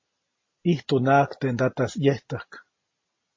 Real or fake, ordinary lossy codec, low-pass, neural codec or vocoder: real; MP3, 32 kbps; 7.2 kHz; none